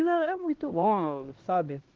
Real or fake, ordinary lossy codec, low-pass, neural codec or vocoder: fake; Opus, 16 kbps; 7.2 kHz; codec, 16 kHz, 1 kbps, X-Codec, HuBERT features, trained on LibriSpeech